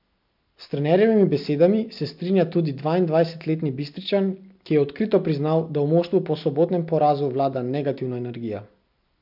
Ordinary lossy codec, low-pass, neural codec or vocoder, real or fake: MP3, 48 kbps; 5.4 kHz; none; real